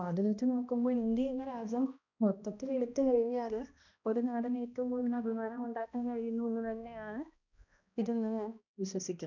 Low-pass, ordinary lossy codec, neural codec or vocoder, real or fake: 7.2 kHz; none; codec, 16 kHz, 1 kbps, X-Codec, HuBERT features, trained on balanced general audio; fake